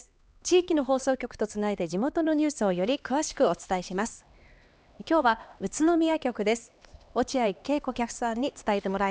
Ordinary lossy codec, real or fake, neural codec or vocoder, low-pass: none; fake; codec, 16 kHz, 2 kbps, X-Codec, HuBERT features, trained on LibriSpeech; none